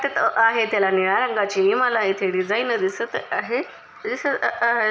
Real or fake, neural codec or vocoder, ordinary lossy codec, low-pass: real; none; none; none